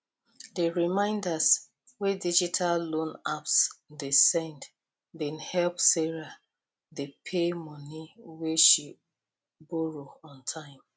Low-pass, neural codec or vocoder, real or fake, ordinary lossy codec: none; none; real; none